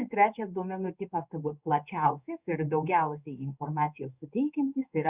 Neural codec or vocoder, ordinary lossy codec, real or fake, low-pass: codec, 16 kHz in and 24 kHz out, 1 kbps, XY-Tokenizer; Opus, 64 kbps; fake; 3.6 kHz